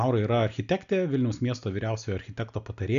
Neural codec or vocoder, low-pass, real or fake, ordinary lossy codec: none; 7.2 kHz; real; MP3, 96 kbps